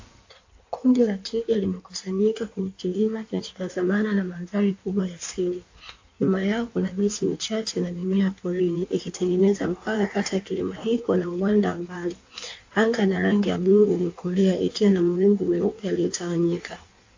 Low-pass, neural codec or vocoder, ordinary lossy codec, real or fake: 7.2 kHz; codec, 16 kHz in and 24 kHz out, 1.1 kbps, FireRedTTS-2 codec; AAC, 48 kbps; fake